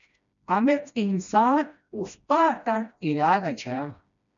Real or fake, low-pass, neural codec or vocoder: fake; 7.2 kHz; codec, 16 kHz, 1 kbps, FreqCodec, smaller model